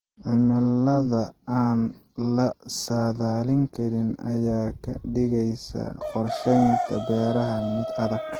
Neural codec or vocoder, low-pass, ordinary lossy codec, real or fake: vocoder, 48 kHz, 128 mel bands, Vocos; 19.8 kHz; Opus, 16 kbps; fake